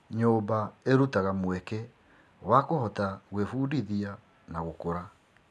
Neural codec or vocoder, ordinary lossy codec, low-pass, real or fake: none; none; none; real